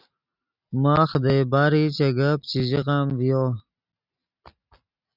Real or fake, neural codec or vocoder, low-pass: real; none; 5.4 kHz